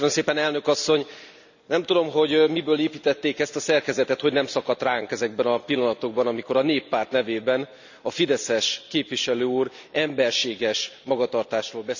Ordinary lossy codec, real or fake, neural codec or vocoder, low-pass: none; real; none; 7.2 kHz